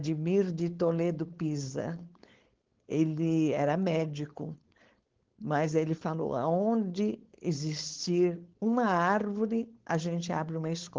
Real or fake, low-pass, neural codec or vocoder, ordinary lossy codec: fake; 7.2 kHz; codec, 16 kHz, 4.8 kbps, FACodec; Opus, 16 kbps